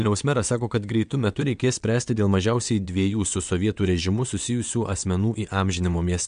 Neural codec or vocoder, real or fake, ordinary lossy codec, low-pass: vocoder, 22.05 kHz, 80 mel bands, WaveNeXt; fake; MP3, 64 kbps; 9.9 kHz